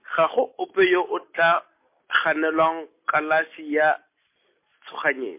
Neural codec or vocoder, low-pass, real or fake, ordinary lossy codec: none; 3.6 kHz; real; MP3, 24 kbps